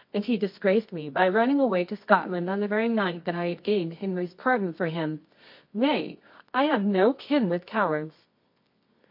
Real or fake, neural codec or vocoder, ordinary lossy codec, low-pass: fake; codec, 24 kHz, 0.9 kbps, WavTokenizer, medium music audio release; MP3, 32 kbps; 5.4 kHz